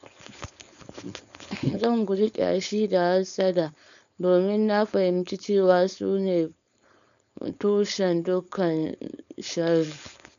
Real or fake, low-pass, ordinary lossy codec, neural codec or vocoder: fake; 7.2 kHz; none; codec, 16 kHz, 4.8 kbps, FACodec